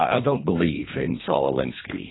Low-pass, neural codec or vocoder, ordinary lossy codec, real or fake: 7.2 kHz; codec, 24 kHz, 1.5 kbps, HILCodec; AAC, 16 kbps; fake